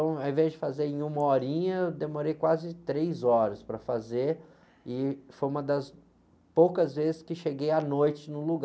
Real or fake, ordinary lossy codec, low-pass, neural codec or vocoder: real; none; none; none